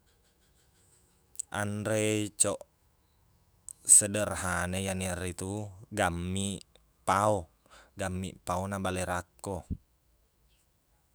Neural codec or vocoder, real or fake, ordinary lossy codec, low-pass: autoencoder, 48 kHz, 128 numbers a frame, DAC-VAE, trained on Japanese speech; fake; none; none